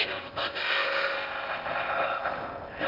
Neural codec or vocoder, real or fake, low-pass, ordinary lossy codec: codec, 16 kHz in and 24 kHz out, 0.6 kbps, FocalCodec, streaming, 2048 codes; fake; 5.4 kHz; Opus, 24 kbps